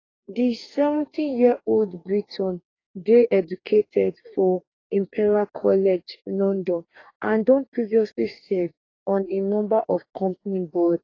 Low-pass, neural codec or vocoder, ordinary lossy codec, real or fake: 7.2 kHz; codec, 44.1 kHz, 2.6 kbps, DAC; AAC, 32 kbps; fake